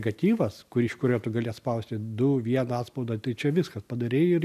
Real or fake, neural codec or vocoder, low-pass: real; none; 14.4 kHz